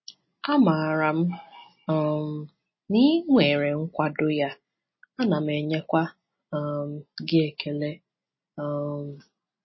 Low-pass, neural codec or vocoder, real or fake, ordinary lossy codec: 7.2 kHz; none; real; MP3, 24 kbps